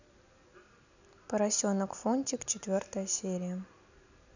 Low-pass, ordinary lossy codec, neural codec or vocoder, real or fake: 7.2 kHz; none; none; real